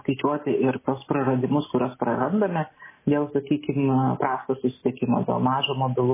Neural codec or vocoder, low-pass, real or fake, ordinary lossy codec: none; 3.6 kHz; real; MP3, 16 kbps